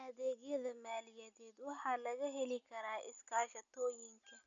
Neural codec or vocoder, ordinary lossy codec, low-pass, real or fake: none; none; 7.2 kHz; real